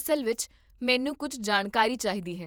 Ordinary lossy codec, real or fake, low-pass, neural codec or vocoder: none; fake; none; vocoder, 48 kHz, 128 mel bands, Vocos